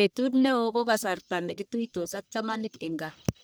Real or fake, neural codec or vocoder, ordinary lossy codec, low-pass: fake; codec, 44.1 kHz, 1.7 kbps, Pupu-Codec; none; none